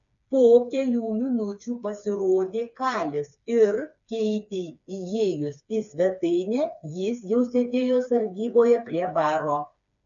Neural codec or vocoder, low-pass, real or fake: codec, 16 kHz, 4 kbps, FreqCodec, smaller model; 7.2 kHz; fake